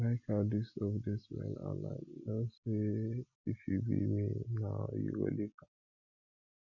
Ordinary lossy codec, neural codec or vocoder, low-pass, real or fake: none; none; 7.2 kHz; real